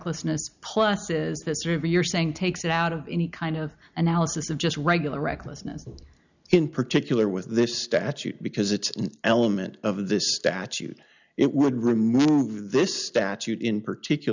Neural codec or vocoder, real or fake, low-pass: none; real; 7.2 kHz